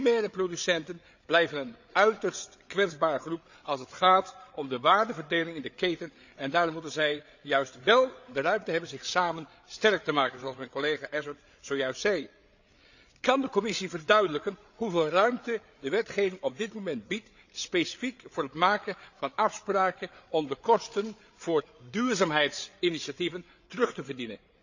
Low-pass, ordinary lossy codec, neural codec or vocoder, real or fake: 7.2 kHz; none; codec, 16 kHz, 8 kbps, FreqCodec, larger model; fake